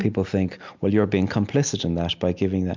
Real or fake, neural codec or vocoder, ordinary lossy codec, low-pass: real; none; MP3, 64 kbps; 7.2 kHz